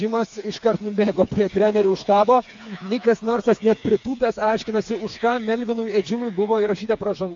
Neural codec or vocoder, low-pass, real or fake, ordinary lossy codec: codec, 16 kHz, 4 kbps, FreqCodec, smaller model; 7.2 kHz; fake; AAC, 64 kbps